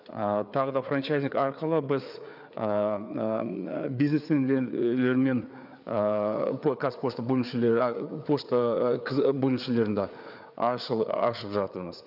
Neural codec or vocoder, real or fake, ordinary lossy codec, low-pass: codec, 16 kHz, 4 kbps, FreqCodec, larger model; fake; none; 5.4 kHz